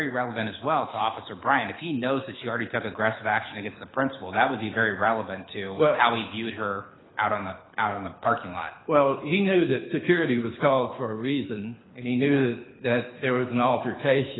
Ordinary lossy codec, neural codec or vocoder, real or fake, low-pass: AAC, 16 kbps; codec, 24 kHz, 6 kbps, HILCodec; fake; 7.2 kHz